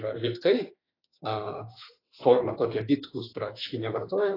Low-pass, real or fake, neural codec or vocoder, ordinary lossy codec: 5.4 kHz; fake; autoencoder, 48 kHz, 32 numbers a frame, DAC-VAE, trained on Japanese speech; AAC, 24 kbps